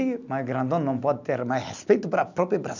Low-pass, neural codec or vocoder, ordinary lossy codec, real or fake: 7.2 kHz; none; none; real